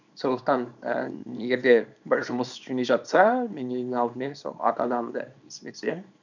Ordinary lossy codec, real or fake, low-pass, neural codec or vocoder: none; fake; 7.2 kHz; codec, 24 kHz, 0.9 kbps, WavTokenizer, small release